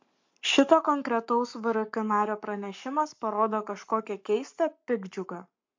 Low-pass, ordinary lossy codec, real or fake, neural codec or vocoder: 7.2 kHz; MP3, 48 kbps; fake; codec, 44.1 kHz, 7.8 kbps, Pupu-Codec